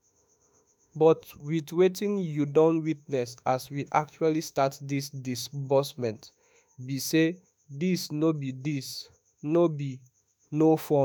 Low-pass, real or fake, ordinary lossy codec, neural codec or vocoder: none; fake; none; autoencoder, 48 kHz, 32 numbers a frame, DAC-VAE, trained on Japanese speech